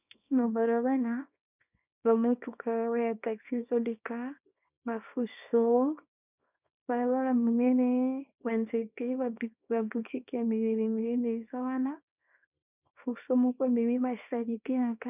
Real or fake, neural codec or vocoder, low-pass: fake; codec, 24 kHz, 0.9 kbps, WavTokenizer, small release; 3.6 kHz